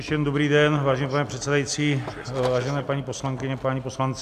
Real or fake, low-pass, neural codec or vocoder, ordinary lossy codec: real; 14.4 kHz; none; AAC, 96 kbps